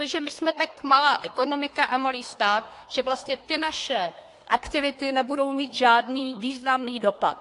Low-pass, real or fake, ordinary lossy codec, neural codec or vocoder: 10.8 kHz; fake; AAC, 48 kbps; codec, 24 kHz, 1 kbps, SNAC